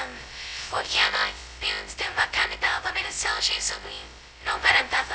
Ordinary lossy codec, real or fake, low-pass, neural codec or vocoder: none; fake; none; codec, 16 kHz, 0.2 kbps, FocalCodec